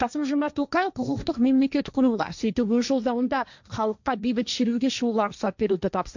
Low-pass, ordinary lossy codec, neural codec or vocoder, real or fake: 7.2 kHz; none; codec, 16 kHz, 1.1 kbps, Voila-Tokenizer; fake